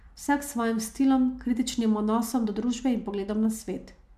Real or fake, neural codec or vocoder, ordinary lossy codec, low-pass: real; none; MP3, 96 kbps; 14.4 kHz